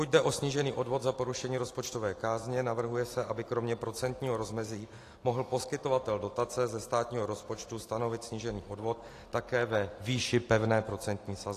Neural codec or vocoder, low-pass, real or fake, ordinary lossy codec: none; 14.4 kHz; real; AAC, 48 kbps